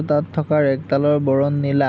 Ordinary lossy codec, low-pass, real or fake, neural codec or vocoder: none; none; real; none